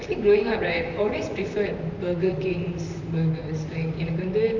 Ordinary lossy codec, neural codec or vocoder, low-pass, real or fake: none; vocoder, 44.1 kHz, 128 mel bands, Pupu-Vocoder; 7.2 kHz; fake